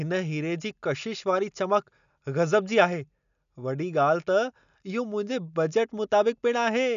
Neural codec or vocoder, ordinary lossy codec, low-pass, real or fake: none; none; 7.2 kHz; real